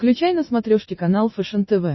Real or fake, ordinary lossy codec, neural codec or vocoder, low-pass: real; MP3, 24 kbps; none; 7.2 kHz